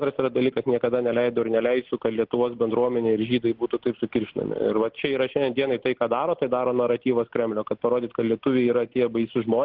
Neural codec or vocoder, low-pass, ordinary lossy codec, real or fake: none; 5.4 kHz; Opus, 16 kbps; real